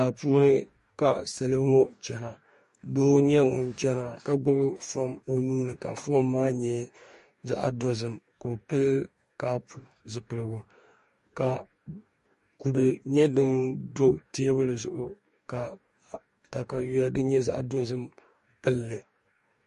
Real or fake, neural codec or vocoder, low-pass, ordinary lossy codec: fake; codec, 44.1 kHz, 2.6 kbps, DAC; 14.4 kHz; MP3, 48 kbps